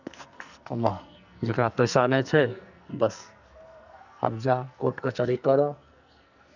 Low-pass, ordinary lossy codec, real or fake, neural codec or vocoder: 7.2 kHz; none; fake; codec, 44.1 kHz, 2.6 kbps, SNAC